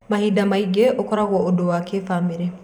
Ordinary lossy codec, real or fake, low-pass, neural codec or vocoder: none; fake; 19.8 kHz; vocoder, 44.1 kHz, 128 mel bands every 512 samples, BigVGAN v2